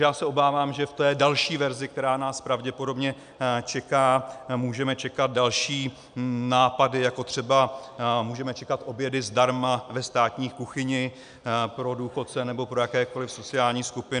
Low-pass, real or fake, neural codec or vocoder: 9.9 kHz; real; none